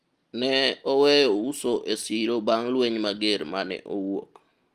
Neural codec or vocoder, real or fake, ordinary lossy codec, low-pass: none; real; Opus, 32 kbps; 14.4 kHz